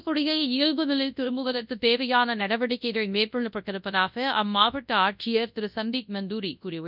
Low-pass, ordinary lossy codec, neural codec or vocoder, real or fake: 5.4 kHz; none; codec, 24 kHz, 0.9 kbps, WavTokenizer, large speech release; fake